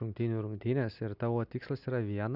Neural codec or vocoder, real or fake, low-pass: none; real; 5.4 kHz